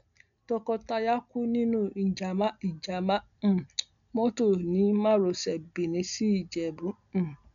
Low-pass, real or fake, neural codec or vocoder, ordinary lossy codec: 7.2 kHz; real; none; none